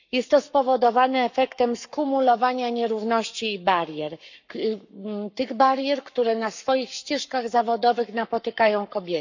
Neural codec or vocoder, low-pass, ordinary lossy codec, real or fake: codec, 44.1 kHz, 7.8 kbps, Pupu-Codec; 7.2 kHz; none; fake